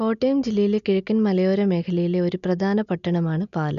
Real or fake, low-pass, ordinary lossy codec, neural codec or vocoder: real; 7.2 kHz; none; none